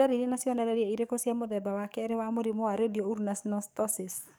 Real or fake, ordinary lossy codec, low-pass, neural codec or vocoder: fake; none; none; codec, 44.1 kHz, 7.8 kbps, DAC